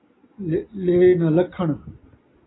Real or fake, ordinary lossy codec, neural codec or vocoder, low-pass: real; AAC, 16 kbps; none; 7.2 kHz